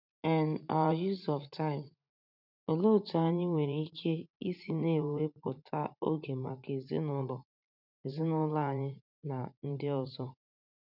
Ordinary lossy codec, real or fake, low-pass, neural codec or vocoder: none; fake; 5.4 kHz; vocoder, 44.1 kHz, 80 mel bands, Vocos